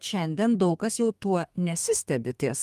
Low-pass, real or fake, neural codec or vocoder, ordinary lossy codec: 14.4 kHz; fake; codec, 32 kHz, 1.9 kbps, SNAC; Opus, 32 kbps